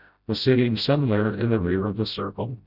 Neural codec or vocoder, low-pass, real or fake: codec, 16 kHz, 0.5 kbps, FreqCodec, smaller model; 5.4 kHz; fake